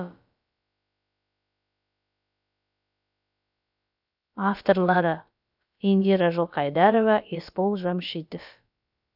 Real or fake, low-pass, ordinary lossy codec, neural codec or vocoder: fake; 5.4 kHz; none; codec, 16 kHz, about 1 kbps, DyCAST, with the encoder's durations